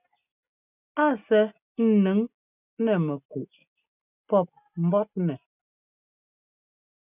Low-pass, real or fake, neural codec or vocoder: 3.6 kHz; real; none